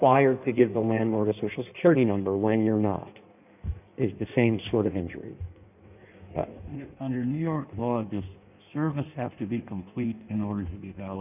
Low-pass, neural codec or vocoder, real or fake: 3.6 kHz; codec, 16 kHz in and 24 kHz out, 1.1 kbps, FireRedTTS-2 codec; fake